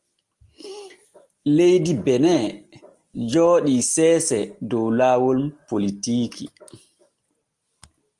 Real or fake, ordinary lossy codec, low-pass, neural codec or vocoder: real; Opus, 24 kbps; 10.8 kHz; none